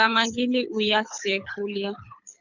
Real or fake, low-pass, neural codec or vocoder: fake; 7.2 kHz; codec, 24 kHz, 6 kbps, HILCodec